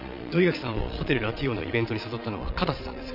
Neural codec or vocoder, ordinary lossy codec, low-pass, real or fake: vocoder, 22.05 kHz, 80 mel bands, Vocos; none; 5.4 kHz; fake